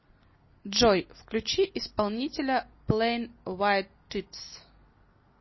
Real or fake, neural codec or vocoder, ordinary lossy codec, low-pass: real; none; MP3, 24 kbps; 7.2 kHz